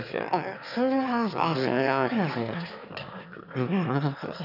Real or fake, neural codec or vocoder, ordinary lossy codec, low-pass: fake; autoencoder, 22.05 kHz, a latent of 192 numbers a frame, VITS, trained on one speaker; none; 5.4 kHz